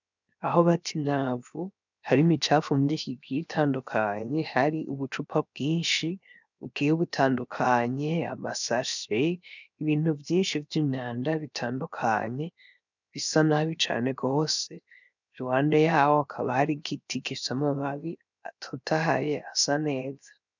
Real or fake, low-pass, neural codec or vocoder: fake; 7.2 kHz; codec, 16 kHz, 0.7 kbps, FocalCodec